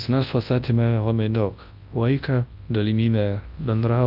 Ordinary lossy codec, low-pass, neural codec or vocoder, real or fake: Opus, 24 kbps; 5.4 kHz; codec, 24 kHz, 0.9 kbps, WavTokenizer, large speech release; fake